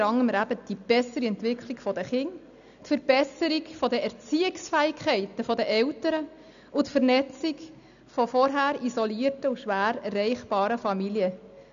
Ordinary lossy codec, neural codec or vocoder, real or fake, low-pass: none; none; real; 7.2 kHz